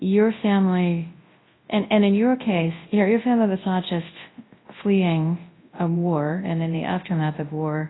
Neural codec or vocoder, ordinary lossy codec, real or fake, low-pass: codec, 24 kHz, 0.9 kbps, WavTokenizer, large speech release; AAC, 16 kbps; fake; 7.2 kHz